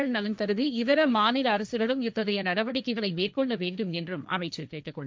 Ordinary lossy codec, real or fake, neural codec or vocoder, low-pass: none; fake; codec, 16 kHz, 1.1 kbps, Voila-Tokenizer; none